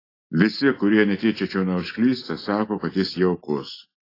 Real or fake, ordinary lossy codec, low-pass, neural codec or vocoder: real; AAC, 24 kbps; 5.4 kHz; none